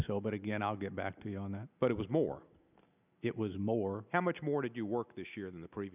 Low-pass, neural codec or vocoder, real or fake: 3.6 kHz; none; real